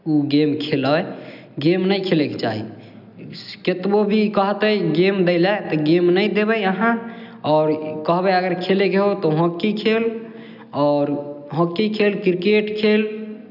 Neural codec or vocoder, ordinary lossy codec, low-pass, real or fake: none; none; 5.4 kHz; real